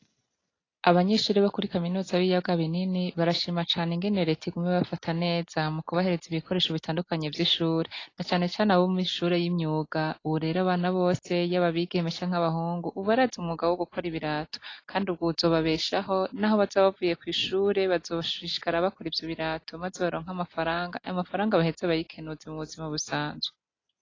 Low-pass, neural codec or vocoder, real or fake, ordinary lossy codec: 7.2 kHz; none; real; AAC, 32 kbps